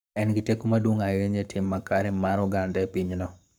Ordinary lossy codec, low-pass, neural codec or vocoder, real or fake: none; none; codec, 44.1 kHz, 7.8 kbps, Pupu-Codec; fake